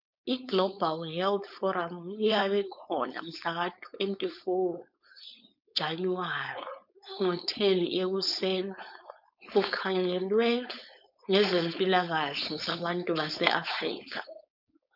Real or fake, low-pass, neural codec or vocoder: fake; 5.4 kHz; codec, 16 kHz, 4.8 kbps, FACodec